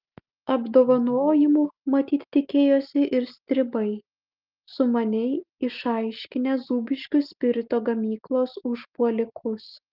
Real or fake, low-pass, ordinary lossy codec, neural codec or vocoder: real; 5.4 kHz; Opus, 24 kbps; none